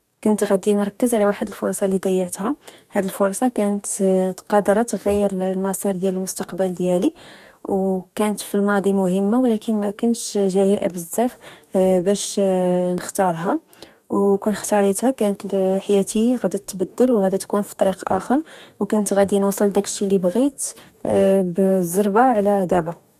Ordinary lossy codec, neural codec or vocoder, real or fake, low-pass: none; codec, 44.1 kHz, 2.6 kbps, DAC; fake; 14.4 kHz